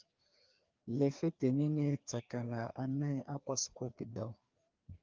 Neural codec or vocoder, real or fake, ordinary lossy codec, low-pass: codec, 16 kHz, 2 kbps, FreqCodec, larger model; fake; Opus, 32 kbps; 7.2 kHz